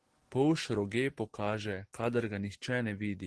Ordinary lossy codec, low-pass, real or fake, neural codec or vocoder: Opus, 16 kbps; 10.8 kHz; real; none